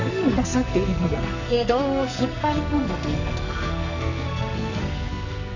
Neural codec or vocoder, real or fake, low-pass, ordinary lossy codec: codec, 44.1 kHz, 2.6 kbps, SNAC; fake; 7.2 kHz; none